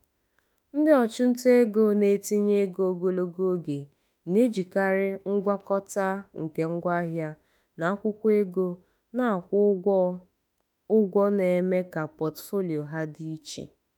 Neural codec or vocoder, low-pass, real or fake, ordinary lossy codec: autoencoder, 48 kHz, 32 numbers a frame, DAC-VAE, trained on Japanese speech; none; fake; none